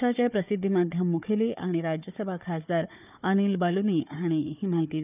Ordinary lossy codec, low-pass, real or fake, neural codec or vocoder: none; 3.6 kHz; fake; codec, 16 kHz, 4 kbps, FunCodec, trained on Chinese and English, 50 frames a second